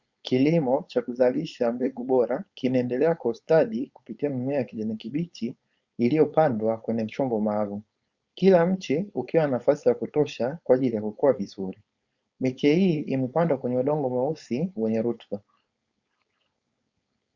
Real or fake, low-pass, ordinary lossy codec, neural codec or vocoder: fake; 7.2 kHz; Opus, 64 kbps; codec, 16 kHz, 4.8 kbps, FACodec